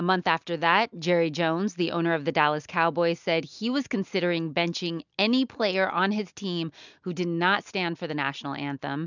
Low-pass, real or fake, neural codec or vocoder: 7.2 kHz; real; none